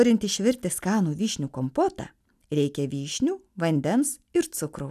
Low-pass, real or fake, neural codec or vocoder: 14.4 kHz; real; none